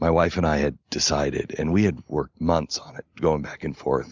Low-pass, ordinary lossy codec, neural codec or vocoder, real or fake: 7.2 kHz; Opus, 64 kbps; none; real